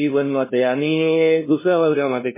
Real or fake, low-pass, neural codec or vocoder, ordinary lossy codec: fake; 3.6 kHz; codec, 16 kHz, 0.5 kbps, FunCodec, trained on LibriTTS, 25 frames a second; MP3, 16 kbps